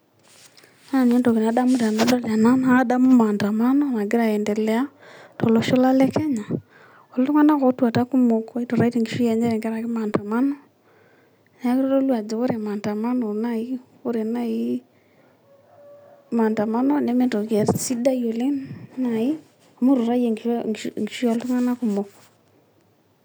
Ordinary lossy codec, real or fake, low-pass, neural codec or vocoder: none; real; none; none